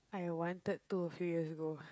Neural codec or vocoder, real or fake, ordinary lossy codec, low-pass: codec, 16 kHz, 16 kbps, FreqCodec, smaller model; fake; none; none